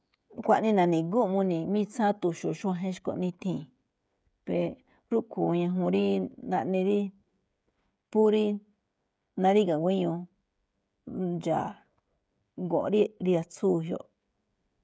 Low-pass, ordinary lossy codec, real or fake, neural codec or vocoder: none; none; fake; codec, 16 kHz, 16 kbps, FreqCodec, smaller model